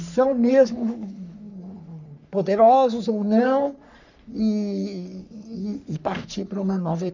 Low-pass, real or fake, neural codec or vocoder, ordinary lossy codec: 7.2 kHz; fake; codec, 44.1 kHz, 3.4 kbps, Pupu-Codec; none